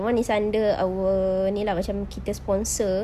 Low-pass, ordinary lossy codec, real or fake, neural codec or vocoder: 14.4 kHz; Opus, 64 kbps; real; none